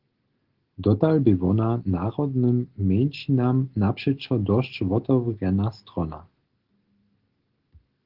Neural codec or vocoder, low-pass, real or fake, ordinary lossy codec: none; 5.4 kHz; real; Opus, 16 kbps